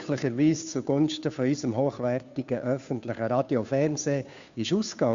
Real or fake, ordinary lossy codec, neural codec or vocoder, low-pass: fake; Opus, 64 kbps; codec, 16 kHz, 2 kbps, FunCodec, trained on Chinese and English, 25 frames a second; 7.2 kHz